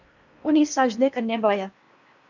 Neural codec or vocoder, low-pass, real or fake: codec, 16 kHz in and 24 kHz out, 0.8 kbps, FocalCodec, streaming, 65536 codes; 7.2 kHz; fake